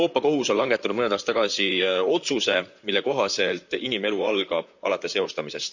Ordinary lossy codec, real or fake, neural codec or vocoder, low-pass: none; fake; vocoder, 44.1 kHz, 128 mel bands, Pupu-Vocoder; 7.2 kHz